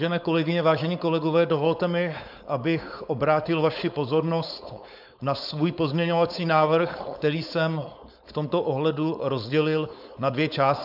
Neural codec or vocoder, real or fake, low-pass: codec, 16 kHz, 4.8 kbps, FACodec; fake; 5.4 kHz